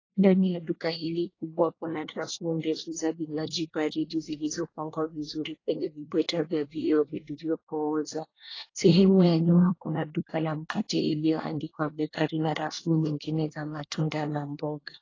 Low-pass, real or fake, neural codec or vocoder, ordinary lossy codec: 7.2 kHz; fake; codec, 24 kHz, 1 kbps, SNAC; AAC, 32 kbps